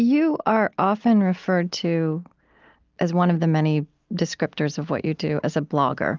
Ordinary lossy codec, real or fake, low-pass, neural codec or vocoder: Opus, 24 kbps; real; 7.2 kHz; none